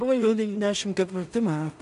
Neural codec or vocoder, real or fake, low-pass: codec, 16 kHz in and 24 kHz out, 0.4 kbps, LongCat-Audio-Codec, two codebook decoder; fake; 10.8 kHz